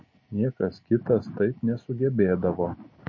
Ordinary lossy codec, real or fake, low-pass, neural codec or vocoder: MP3, 32 kbps; real; 7.2 kHz; none